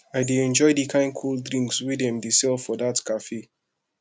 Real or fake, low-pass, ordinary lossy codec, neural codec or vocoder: real; none; none; none